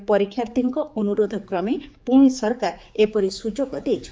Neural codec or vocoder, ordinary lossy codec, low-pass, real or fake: codec, 16 kHz, 4 kbps, X-Codec, HuBERT features, trained on general audio; none; none; fake